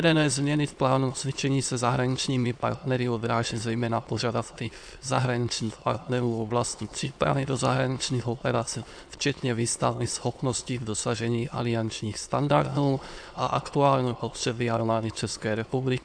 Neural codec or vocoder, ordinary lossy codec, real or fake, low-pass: autoencoder, 22.05 kHz, a latent of 192 numbers a frame, VITS, trained on many speakers; AAC, 64 kbps; fake; 9.9 kHz